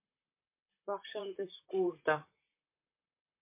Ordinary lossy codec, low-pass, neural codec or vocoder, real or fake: MP3, 24 kbps; 3.6 kHz; vocoder, 44.1 kHz, 128 mel bands, Pupu-Vocoder; fake